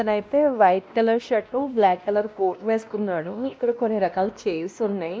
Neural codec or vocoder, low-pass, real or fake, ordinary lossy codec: codec, 16 kHz, 1 kbps, X-Codec, WavLM features, trained on Multilingual LibriSpeech; none; fake; none